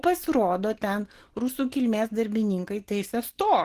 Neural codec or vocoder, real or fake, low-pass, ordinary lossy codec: none; real; 14.4 kHz; Opus, 16 kbps